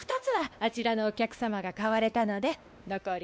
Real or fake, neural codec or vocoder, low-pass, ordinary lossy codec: fake; codec, 16 kHz, 2 kbps, X-Codec, WavLM features, trained on Multilingual LibriSpeech; none; none